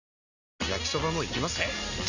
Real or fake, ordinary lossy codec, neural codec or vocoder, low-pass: real; none; none; 7.2 kHz